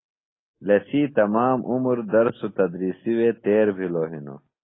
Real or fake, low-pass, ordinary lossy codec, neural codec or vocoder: real; 7.2 kHz; AAC, 16 kbps; none